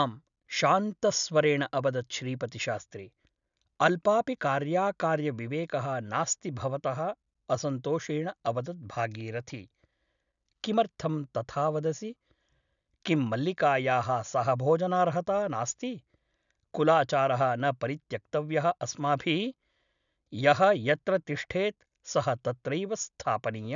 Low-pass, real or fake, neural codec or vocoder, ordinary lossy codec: 7.2 kHz; real; none; none